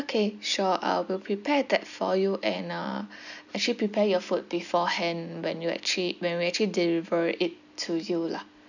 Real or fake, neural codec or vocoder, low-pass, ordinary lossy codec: real; none; 7.2 kHz; none